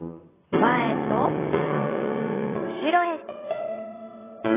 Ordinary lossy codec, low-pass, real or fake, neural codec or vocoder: AAC, 16 kbps; 3.6 kHz; fake; vocoder, 22.05 kHz, 80 mel bands, WaveNeXt